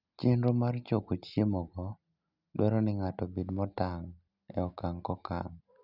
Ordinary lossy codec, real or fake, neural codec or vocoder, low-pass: none; real; none; 5.4 kHz